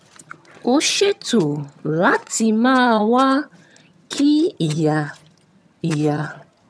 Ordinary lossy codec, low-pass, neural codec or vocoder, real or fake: none; none; vocoder, 22.05 kHz, 80 mel bands, HiFi-GAN; fake